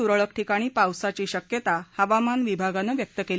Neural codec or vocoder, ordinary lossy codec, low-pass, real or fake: none; none; none; real